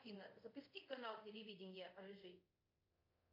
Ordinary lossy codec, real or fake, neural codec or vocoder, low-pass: MP3, 32 kbps; fake; codec, 24 kHz, 0.5 kbps, DualCodec; 5.4 kHz